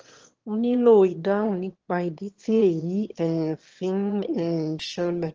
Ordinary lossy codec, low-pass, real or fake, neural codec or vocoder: Opus, 16 kbps; 7.2 kHz; fake; autoencoder, 22.05 kHz, a latent of 192 numbers a frame, VITS, trained on one speaker